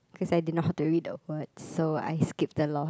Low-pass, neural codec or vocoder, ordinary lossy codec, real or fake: none; none; none; real